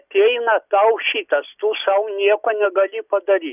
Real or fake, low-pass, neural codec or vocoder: real; 3.6 kHz; none